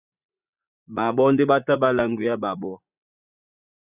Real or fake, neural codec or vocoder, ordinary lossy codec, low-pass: fake; vocoder, 44.1 kHz, 80 mel bands, Vocos; Opus, 64 kbps; 3.6 kHz